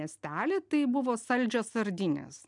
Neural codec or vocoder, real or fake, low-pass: none; real; 10.8 kHz